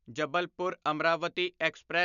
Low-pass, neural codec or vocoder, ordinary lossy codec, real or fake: 7.2 kHz; none; none; real